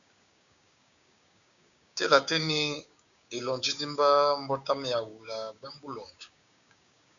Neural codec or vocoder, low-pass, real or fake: codec, 16 kHz, 6 kbps, DAC; 7.2 kHz; fake